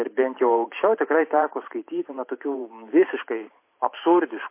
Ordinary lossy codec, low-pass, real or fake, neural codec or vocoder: MP3, 24 kbps; 3.6 kHz; fake; vocoder, 44.1 kHz, 128 mel bands every 512 samples, BigVGAN v2